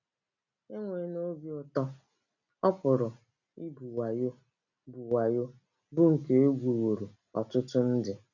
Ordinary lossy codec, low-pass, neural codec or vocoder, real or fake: none; 7.2 kHz; none; real